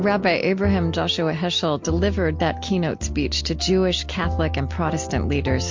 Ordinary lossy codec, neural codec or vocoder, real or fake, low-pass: MP3, 48 kbps; none; real; 7.2 kHz